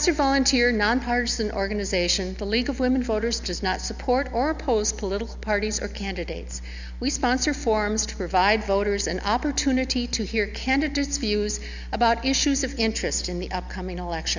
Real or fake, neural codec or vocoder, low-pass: real; none; 7.2 kHz